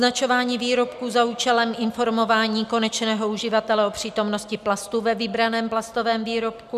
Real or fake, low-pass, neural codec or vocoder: real; 14.4 kHz; none